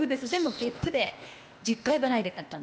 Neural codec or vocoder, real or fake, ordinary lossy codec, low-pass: codec, 16 kHz, 0.8 kbps, ZipCodec; fake; none; none